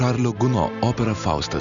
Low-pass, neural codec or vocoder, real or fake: 7.2 kHz; none; real